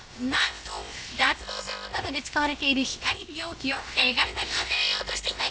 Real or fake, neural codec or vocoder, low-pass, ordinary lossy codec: fake; codec, 16 kHz, about 1 kbps, DyCAST, with the encoder's durations; none; none